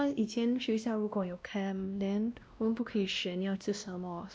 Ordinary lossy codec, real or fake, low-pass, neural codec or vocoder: none; fake; none; codec, 16 kHz, 1 kbps, X-Codec, WavLM features, trained on Multilingual LibriSpeech